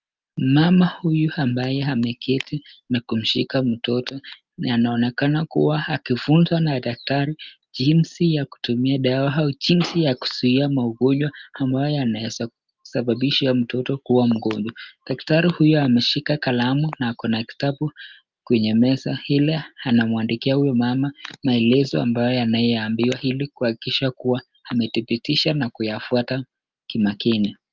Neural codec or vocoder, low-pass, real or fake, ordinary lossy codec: none; 7.2 kHz; real; Opus, 24 kbps